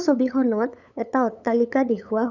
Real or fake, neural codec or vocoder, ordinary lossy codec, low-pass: fake; codec, 16 kHz, 8 kbps, FunCodec, trained on LibriTTS, 25 frames a second; none; 7.2 kHz